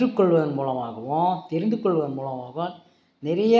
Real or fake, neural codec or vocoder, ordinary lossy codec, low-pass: real; none; none; none